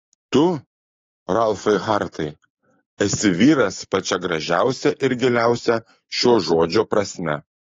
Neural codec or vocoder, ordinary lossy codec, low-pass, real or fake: codec, 16 kHz, 6 kbps, DAC; AAC, 32 kbps; 7.2 kHz; fake